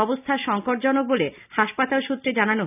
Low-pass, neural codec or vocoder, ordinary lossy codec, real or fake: 3.6 kHz; none; none; real